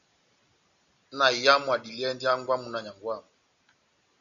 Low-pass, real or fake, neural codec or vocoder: 7.2 kHz; real; none